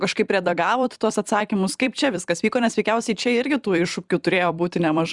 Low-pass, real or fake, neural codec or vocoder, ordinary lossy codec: 10.8 kHz; fake; vocoder, 44.1 kHz, 128 mel bands every 256 samples, BigVGAN v2; Opus, 64 kbps